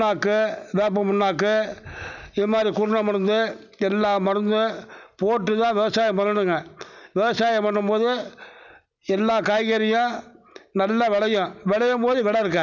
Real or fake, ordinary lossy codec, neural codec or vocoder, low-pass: real; none; none; 7.2 kHz